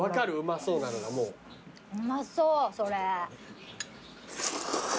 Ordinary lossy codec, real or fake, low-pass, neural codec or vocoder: none; real; none; none